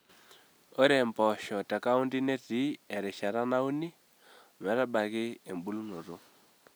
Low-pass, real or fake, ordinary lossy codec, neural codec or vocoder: none; real; none; none